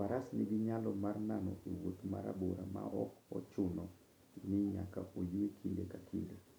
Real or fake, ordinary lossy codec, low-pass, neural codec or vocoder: real; none; none; none